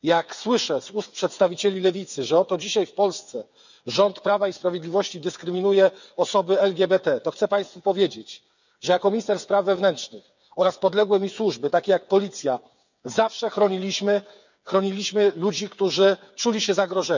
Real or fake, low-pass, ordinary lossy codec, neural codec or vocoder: fake; 7.2 kHz; none; codec, 16 kHz, 8 kbps, FreqCodec, smaller model